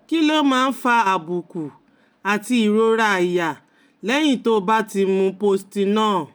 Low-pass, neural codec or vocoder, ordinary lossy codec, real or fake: none; none; none; real